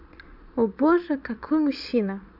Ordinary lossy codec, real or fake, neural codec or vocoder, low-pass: none; fake; vocoder, 22.05 kHz, 80 mel bands, Vocos; 5.4 kHz